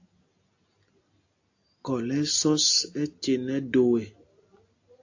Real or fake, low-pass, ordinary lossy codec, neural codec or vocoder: real; 7.2 kHz; AAC, 48 kbps; none